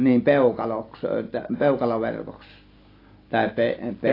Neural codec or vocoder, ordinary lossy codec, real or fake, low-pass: none; AAC, 32 kbps; real; 5.4 kHz